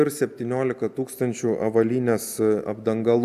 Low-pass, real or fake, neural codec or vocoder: 14.4 kHz; real; none